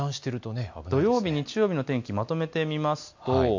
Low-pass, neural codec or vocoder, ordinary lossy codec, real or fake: 7.2 kHz; none; MP3, 48 kbps; real